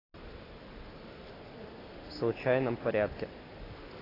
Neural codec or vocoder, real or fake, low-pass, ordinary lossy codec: none; real; 5.4 kHz; AAC, 24 kbps